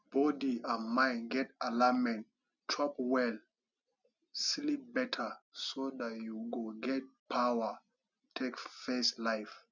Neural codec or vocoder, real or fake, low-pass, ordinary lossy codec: none; real; 7.2 kHz; none